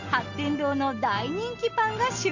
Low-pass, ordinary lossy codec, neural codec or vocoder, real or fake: 7.2 kHz; none; none; real